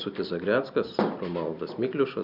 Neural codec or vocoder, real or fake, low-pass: none; real; 5.4 kHz